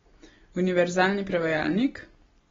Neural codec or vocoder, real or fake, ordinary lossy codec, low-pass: none; real; AAC, 24 kbps; 7.2 kHz